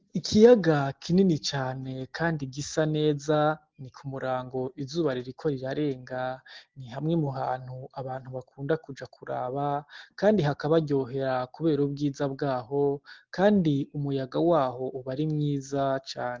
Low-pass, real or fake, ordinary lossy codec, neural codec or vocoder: 7.2 kHz; real; Opus, 16 kbps; none